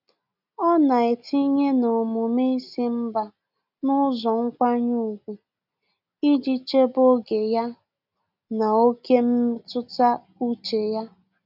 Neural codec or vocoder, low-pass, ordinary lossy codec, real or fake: none; 5.4 kHz; none; real